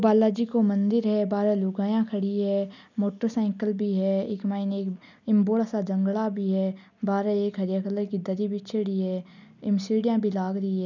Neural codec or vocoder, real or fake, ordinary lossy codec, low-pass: none; real; none; 7.2 kHz